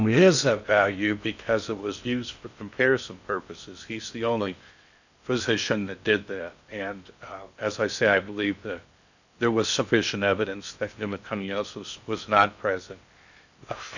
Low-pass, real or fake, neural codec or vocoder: 7.2 kHz; fake; codec, 16 kHz in and 24 kHz out, 0.6 kbps, FocalCodec, streaming, 2048 codes